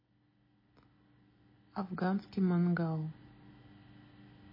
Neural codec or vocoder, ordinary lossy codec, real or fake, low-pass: none; MP3, 24 kbps; real; 5.4 kHz